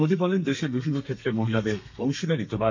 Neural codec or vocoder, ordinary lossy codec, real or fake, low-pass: codec, 32 kHz, 1.9 kbps, SNAC; MP3, 64 kbps; fake; 7.2 kHz